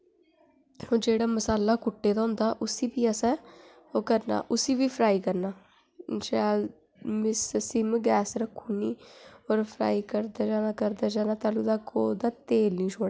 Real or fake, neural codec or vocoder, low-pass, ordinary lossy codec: real; none; none; none